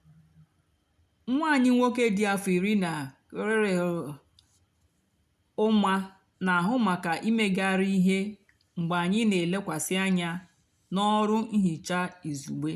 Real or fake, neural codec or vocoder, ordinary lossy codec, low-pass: real; none; none; 14.4 kHz